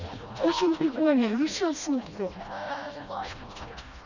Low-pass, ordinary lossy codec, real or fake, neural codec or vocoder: 7.2 kHz; none; fake; codec, 16 kHz, 1 kbps, FreqCodec, smaller model